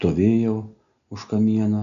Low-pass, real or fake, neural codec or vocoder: 7.2 kHz; real; none